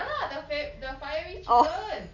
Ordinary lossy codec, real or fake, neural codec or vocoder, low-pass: none; real; none; 7.2 kHz